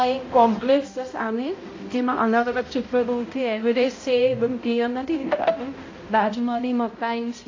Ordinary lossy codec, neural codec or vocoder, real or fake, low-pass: AAC, 32 kbps; codec, 16 kHz, 0.5 kbps, X-Codec, HuBERT features, trained on balanced general audio; fake; 7.2 kHz